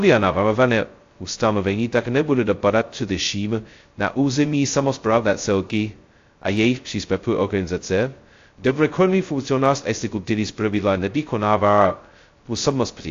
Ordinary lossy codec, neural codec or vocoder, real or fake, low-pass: AAC, 48 kbps; codec, 16 kHz, 0.2 kbps, FocalCodec; fake; 7.2 kHz